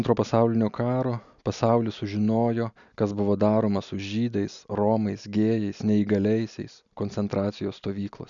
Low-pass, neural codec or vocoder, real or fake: 7.2 kHz; none; real